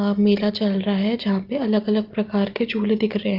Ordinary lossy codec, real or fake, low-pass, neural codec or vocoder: Opus, 24 kbps; real; 5.4 kHz; none